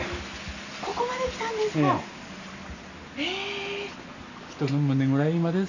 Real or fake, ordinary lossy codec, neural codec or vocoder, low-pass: real; none; none; 7.2 kHz